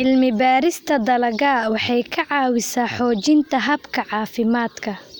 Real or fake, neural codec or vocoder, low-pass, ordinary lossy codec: real; none; none; none